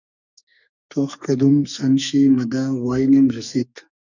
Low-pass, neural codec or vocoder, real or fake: 7.2 kHz; codec, 44.1 kHz, 2.6 kbps, SNAC; fake